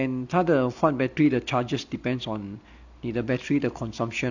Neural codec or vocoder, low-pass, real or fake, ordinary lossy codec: none; 7.2 kHz; real; none